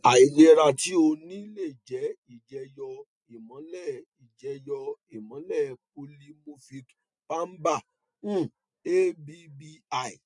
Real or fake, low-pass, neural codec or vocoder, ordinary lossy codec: real; 10.8 kHz; none; MP3, 64 kbps